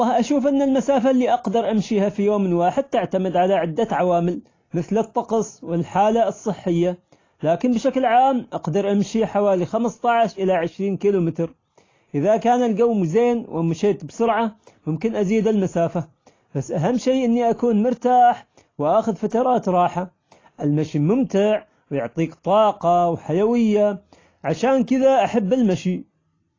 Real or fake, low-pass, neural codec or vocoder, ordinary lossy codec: real; 7.2 kHz; none; AAC, 32 kbps